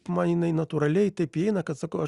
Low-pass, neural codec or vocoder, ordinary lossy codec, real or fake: 10.8 kHz; none; Opus, 64 kbps; real